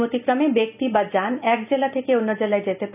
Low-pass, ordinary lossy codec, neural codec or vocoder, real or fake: 3.6 kHz; none; none; real